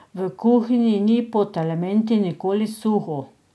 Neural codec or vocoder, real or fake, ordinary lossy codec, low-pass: none; real; none; none